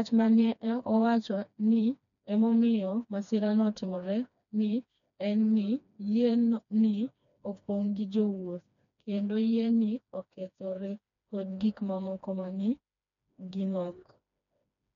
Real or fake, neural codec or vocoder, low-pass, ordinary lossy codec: fake; codec, 16 kHz, 2 kbps, FreqCodec, smaller model; 7.2 kHz; MP3, 96 kbps